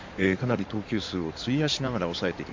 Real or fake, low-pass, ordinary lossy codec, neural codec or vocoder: fake; 7.2 kHz; MP3, 48 kbps; codec, 16 kHz in and 24 kHz out, 2.2 kbps, FireRedTTS-2 codec